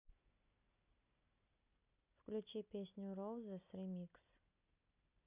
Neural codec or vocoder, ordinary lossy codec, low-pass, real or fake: none; none; 3.6 kHz; real